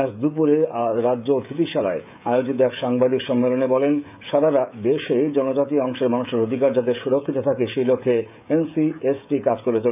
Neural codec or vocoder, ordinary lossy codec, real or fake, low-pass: codec, 16 kHz, 16 kbps, FreqCodec, smaller model; none; fake; 3.6 kHz